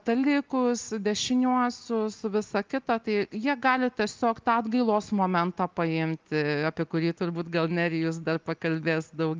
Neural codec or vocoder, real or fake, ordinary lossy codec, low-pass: none; real; Opus, 32 kbps; 7.2 kHz